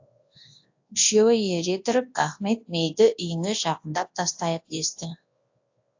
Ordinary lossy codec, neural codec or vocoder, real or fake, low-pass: AAC, 48 kbps; codec, 24 kHz, 0.9 kbps, WavTokenizer, large speech release; fake; 7.2 kHz